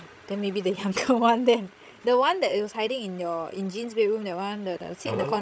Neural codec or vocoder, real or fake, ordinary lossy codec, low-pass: codec, 16 kHz, 16 kbps, FreqCodec, larger model; fake; none; none